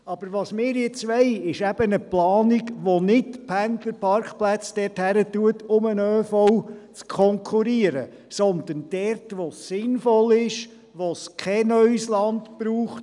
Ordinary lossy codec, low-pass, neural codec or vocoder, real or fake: none; 10.8 kHz; none; real